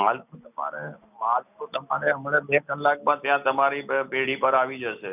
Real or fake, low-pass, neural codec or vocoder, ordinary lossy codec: real; 3.6 kHz; none; none